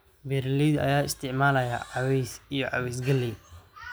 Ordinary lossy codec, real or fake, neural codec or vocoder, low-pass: none; real; none; none